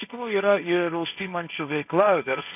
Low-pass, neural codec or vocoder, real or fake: 3.6 kHz; codec, 16 kHz, 1.1 kbps, Voila-Tokenizer; fake